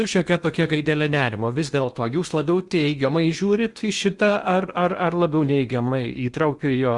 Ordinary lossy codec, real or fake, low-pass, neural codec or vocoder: Opus, 64 kbps; fake; 10.8 kHz; codec, 16 kHz in and 24 kHz out, 0.8 kbps, FocalCodec, streaming, 65536 codes